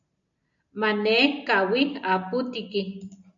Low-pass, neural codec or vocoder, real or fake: 7.2 kHz; none; real